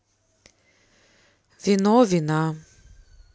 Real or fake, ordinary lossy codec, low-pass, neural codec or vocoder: real; none; none; none